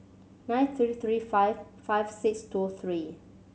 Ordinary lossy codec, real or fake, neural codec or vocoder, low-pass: none; real; none; none